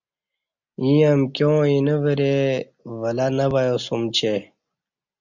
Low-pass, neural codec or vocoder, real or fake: 7.2 kHz; none; real